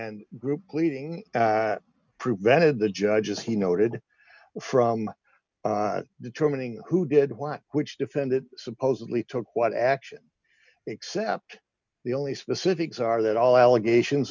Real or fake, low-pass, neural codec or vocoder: real; 7.2 kHz; none